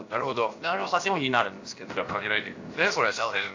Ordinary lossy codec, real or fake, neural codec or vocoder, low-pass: AAC, 48 kbps; fake; codec, 16 kHz, about 1 kbps, DyCAST, with the encoder's durations; 7.2 kHz